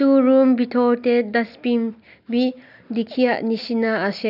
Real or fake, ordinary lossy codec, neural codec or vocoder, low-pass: real; none; none; 5.4 kHz